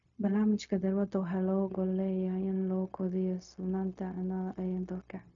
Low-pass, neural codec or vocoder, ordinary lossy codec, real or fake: 7.2 kHz; codec, 16 kHz, 0.4 kbps, LongCat-Audio-Codec; MP3, 96 kbps; fake